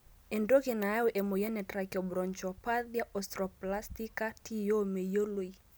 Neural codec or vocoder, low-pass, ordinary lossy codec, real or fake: none; none; none; real